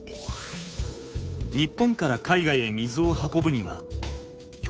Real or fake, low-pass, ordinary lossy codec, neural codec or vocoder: fake; none; none; codec, 16 kHz, 2 kbps, FunCodec, trained on Chinese and English, 25 frames a second